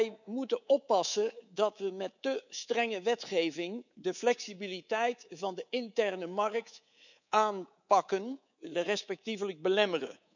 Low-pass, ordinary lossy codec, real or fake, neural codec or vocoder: 7.2 kHz; none; fake; codec, 24 kHz, 3.1 kbps, DualCodec